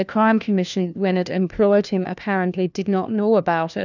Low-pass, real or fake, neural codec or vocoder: 7.2 kHz; fake; codec, 16 kHz, 1 kbps, FunCodec, trained on LibriTTS, 50 frames a second